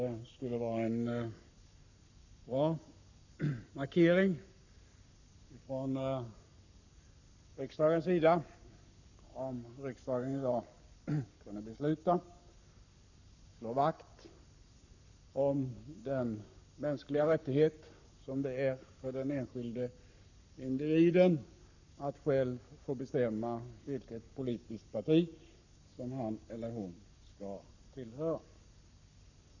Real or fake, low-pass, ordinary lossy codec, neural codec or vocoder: fake; 7.2 kHz; none; codec, 44.1 kHz, 7.8 kbps, Pupu-Codec